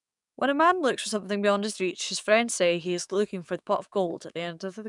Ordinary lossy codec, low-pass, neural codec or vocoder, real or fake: none; 10.8 kHz; codec, 44.1 kHz, 7.8 kbps, DAC; fake